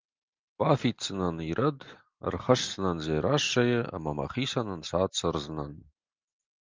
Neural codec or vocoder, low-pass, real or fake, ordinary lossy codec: none; 7.2 kHz; real; Opus, 24 kbps